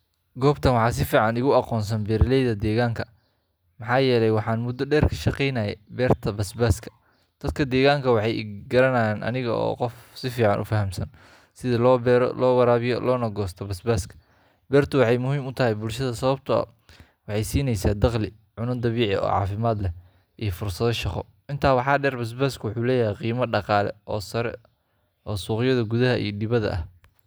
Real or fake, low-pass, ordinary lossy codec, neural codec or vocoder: real; none; none; none